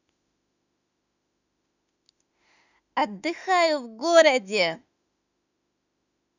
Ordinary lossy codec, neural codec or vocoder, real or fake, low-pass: none; autoencoder, 48 kHz, 32 numbers a frame, DAC-VAE, trained on Japanese speech; fake; 7.2 kHz